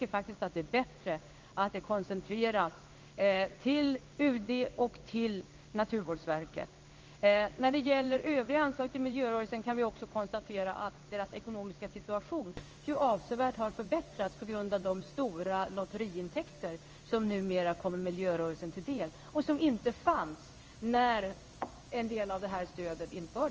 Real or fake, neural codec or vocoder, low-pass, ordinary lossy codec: fake; codec, 16 kHz in and 24 kHz out, 1 kbps, XY-Tokenizer; 7.2 kHz; Opus, 24 kbps